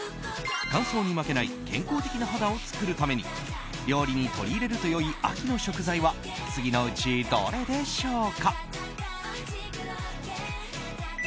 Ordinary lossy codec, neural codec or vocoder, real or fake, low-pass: none; none; real; none